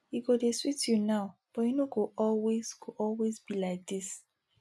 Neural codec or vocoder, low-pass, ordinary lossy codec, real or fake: none; none; none; real